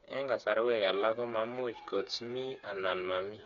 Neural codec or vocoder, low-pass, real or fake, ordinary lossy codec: codec, 16 kHz, 4 kbps, FreqCodec, smaller model; 7.2 kHz; fake; none